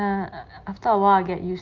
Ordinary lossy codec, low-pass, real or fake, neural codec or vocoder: Opus, 24 kbps; 7.2 kHz; real; none